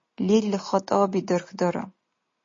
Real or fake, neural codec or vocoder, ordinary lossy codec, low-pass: real; none; MP3, 32 kbps; 7.2 kHz